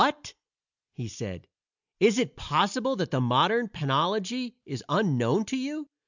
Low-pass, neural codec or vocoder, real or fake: 7.2 kHz; none; real